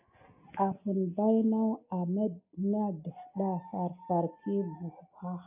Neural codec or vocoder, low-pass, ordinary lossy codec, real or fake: none; 3.6 kHz; AAC, 32 kbps; real